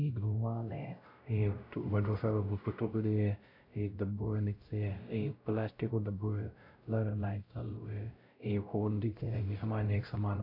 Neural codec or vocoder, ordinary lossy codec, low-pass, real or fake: codec, 16 kHz, 0.5 kbps, X-Codec, WavLM features, trained on Multilingual LibriSpeech; AAC, 32 kbps; 5.4 kHz; fake